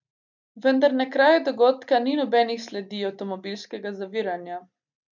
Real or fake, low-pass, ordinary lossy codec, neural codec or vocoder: real; 7.2 kHz; none; none